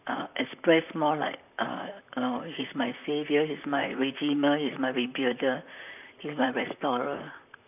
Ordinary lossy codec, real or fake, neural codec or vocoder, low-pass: none; fake; vocoder, 44.1 kHz, 128 mel bands, Pupu-Vocoder; 3.6 kHz